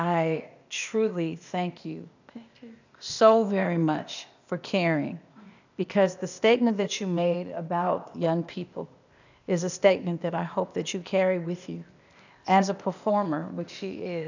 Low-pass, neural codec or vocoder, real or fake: 7.2 kHz; codec, 16 kHz, 0.8 kbps, ZipCodec; fake